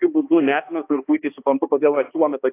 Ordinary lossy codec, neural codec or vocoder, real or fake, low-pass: AAC, 24 kbps; codec, 16 kHz, 2 kbps, X-Codec, HuBERT features, trained on balanced general audio; fake; 3.6 kHz